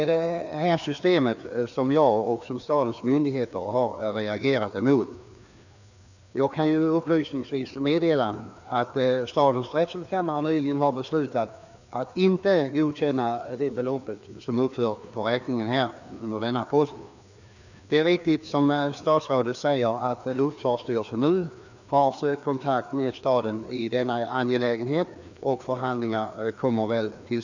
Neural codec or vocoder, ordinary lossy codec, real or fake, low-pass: codec, 16 kHz, 2 kbps, FreqCodec, larger model; none; fake; 7.2 kHz